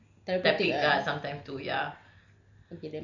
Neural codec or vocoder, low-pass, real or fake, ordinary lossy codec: none; 7.2 kHz; real; none